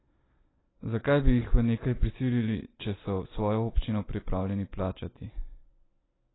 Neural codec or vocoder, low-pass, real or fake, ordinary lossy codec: none; 7.2 kHz; real; AAC, 16 kbps